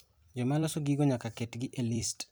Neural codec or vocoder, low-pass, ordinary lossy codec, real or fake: vocoder, 44.1 kHz, 128 mel bands every 256 samples, BigVGAN v2; none; none; fake